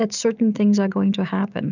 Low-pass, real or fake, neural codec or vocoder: 7.2 kHz; real; none